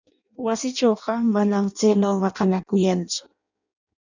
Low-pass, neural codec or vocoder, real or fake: 7.2 kHz; codec, 16 kHz in and 24 kHz out, 0.6 kbps, FireRedTTS-2 codec; fake